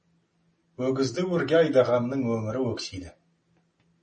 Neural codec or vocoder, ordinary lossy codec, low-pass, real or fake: none; MP3, 32 kbps; 10.8 kHz; real